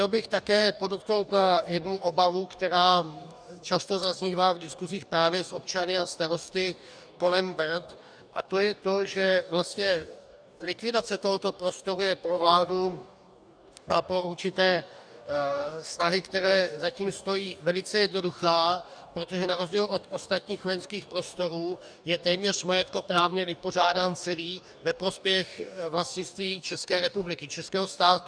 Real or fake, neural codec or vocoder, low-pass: fake; codec, 44.1 kHz, 2.6 kbps, DAC; 9.9 kHz